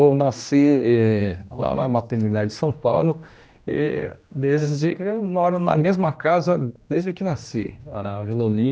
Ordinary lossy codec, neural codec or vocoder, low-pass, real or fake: none; codec, 16 kHz, 1 kbps, X-Codec, HuBERT features, trained on general audio; none; fake